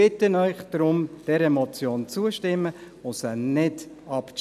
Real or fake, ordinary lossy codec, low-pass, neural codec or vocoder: real; none; 14.4 kHz; none